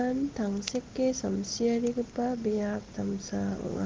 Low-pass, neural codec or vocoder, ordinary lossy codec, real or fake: 7.2 kHz; none; Opus, 16 kbps; real